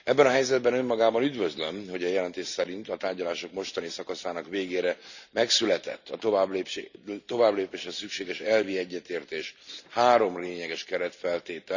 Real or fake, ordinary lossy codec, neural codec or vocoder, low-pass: real; none; none; 7.2 kHz